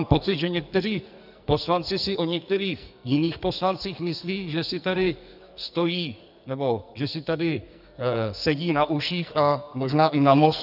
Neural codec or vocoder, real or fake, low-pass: codec, 44.1 kHz, 2.6 kbps, SNAC; fake; 5.4 kHz